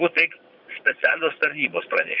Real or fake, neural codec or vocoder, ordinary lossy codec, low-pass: fake; vocoder, 22.05 kHz, 80 mel bands, Vocos; Opus, 64 kbps; 5.4 kHz